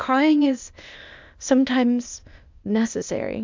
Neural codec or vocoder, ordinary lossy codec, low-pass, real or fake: codec, 16 kHz, 0.8 kbps, ZipCodec; MP3, 64 kbps; 7.2 kHz; fake